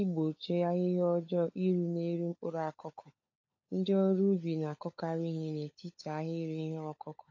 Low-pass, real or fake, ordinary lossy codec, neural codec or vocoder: 7.2 kHz; fake; AAC, 48 kbps; codec, 16 kHz, 16 kbps, FunCodec, trained on LibriTTS, 50 frames a second